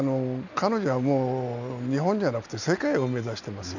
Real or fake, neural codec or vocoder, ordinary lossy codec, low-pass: real; none; none; 7.2 kHz